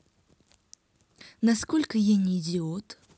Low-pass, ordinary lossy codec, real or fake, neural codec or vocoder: none; none; real; none